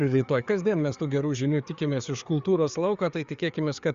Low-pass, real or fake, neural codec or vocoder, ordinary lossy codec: 7.2 kHz; fake; codec, 16 kHz, 4 kbps, FreqCodec, larger model; Opus, 64 kbps